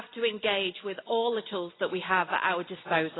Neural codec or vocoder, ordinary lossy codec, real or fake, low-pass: none; AAC, 16 kbps; real; 7.2 kHz